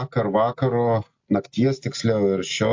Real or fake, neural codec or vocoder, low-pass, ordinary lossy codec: real; none; 7.2 kHz; MP3, 48 kbps